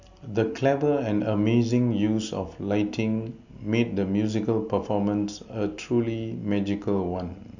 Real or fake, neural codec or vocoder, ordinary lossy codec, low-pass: real; none; none; 7.2 kHz